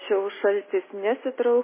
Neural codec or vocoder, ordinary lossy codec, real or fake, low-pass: none; MP3, 16 kbps; real; 3.6 kHz